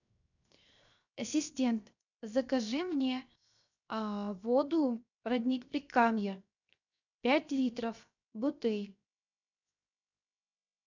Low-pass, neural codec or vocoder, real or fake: 7.2 kHz; codec, 16 kHz, 0.7 kbps, FocalCodec; fake